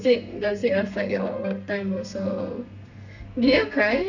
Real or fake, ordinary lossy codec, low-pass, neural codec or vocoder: fake; none; 7.2 kHz; codec, 32 kHz, 1.9 kbps, SNAC